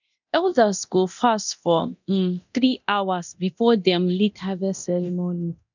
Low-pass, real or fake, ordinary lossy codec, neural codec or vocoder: 7.2 kHz; fake; none; codec, 24 kHz, 0.9 kbps, DualCodec